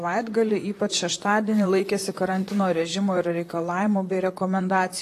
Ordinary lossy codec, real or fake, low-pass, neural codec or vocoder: AAC, 48 kbps; fake; 14.4 kHz; vocoder, 44.1 kHz, 128 mel bands, Pupu-Vocoder